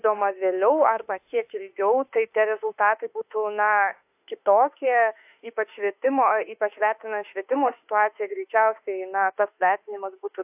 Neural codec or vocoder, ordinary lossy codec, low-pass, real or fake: autoencoder, 48 kHz, 32 numbers a frame, DAC-VAE, trained on Japanese speech; AAC, 32 kbps; 3.6 kHz; fake